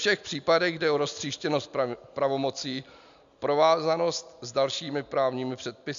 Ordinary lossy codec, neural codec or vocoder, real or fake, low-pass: MP3, 64 kbps; none; real; 7.2 kHz